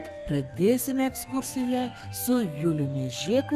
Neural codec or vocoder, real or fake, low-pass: codec, 32 kHz, 1.9 kbps, SNAC; fake; 14.4 kHz